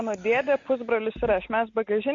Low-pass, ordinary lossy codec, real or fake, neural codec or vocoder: 7.2 kHz; MP3, 48 kbps; real; none